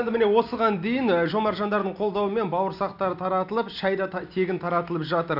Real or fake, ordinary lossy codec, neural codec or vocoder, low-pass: real; none; none; 5.4 kHz